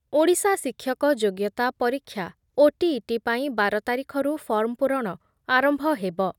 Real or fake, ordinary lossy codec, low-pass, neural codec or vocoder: real; none; 19.8 kHz; none